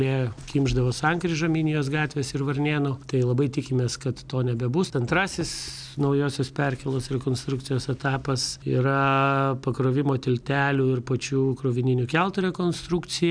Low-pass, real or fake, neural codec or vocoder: 9.9 kHz; real; none